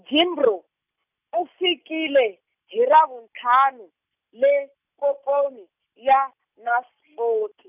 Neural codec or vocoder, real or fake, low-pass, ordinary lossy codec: none; real; 3.6 kHz; none